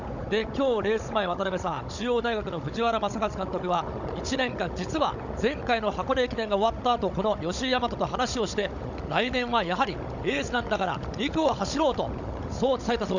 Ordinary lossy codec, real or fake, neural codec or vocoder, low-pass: none; fake; codec, 16 kHz, 16 kbps, FunCodec, trained on Chinese and English, 50 frames a second; 7.2 kHz